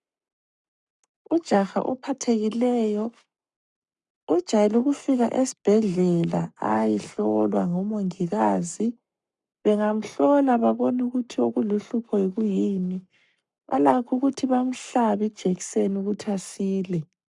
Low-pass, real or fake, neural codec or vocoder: 10.8 kHz; fake; codec, 44.1 kHz, 7.8 kbps, Pupu-Codec